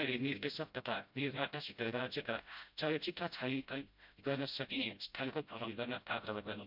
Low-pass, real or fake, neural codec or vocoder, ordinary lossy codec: 5.4 kHz; fake; codec, 16 kHz, 0.5 kbps, FreqCodec, smaller model; MP3, 48 kbps